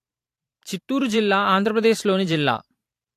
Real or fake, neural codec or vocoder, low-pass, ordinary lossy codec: fake; vocoder, 44.1 kHz, 128 mel bands every 512 samples, BigVGAN v2; 14.4 kHz; AAC, 64 kbps